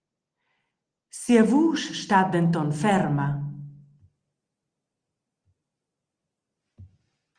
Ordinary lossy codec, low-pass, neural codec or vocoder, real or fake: Opus, 24 kbps; 9.9 kHz; none; real